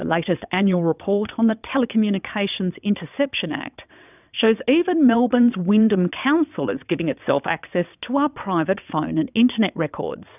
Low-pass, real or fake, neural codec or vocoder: 3.6 kHz; fake; vocoder, 22.05 kHz, 80 mel bands, WaveNeXt